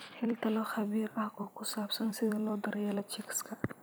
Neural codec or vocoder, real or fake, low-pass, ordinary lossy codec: vocoder, 44.1 kHz, 128 mel bands every 256 samples, BigVGAN v2; fake; none; none